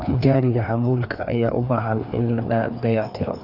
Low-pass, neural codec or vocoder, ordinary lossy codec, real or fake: 5.4 kHz; codec, 16 kHz, 2 kbps, FreqCodec, larger model; none; fake